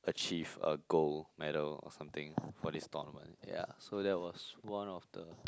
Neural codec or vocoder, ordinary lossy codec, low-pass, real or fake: none; none; none; real